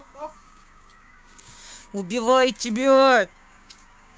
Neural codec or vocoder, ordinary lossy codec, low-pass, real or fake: codec, 16 kHz, 6 kbps, DAC; none; none; fake